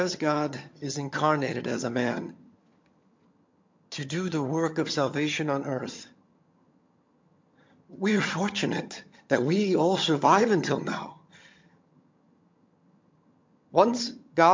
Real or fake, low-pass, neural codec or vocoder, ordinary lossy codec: fake; 7.2 kHz; vocoder, 22.05 kHz, 80 mel bands, HiFi-GAN; MP3, 48 kbps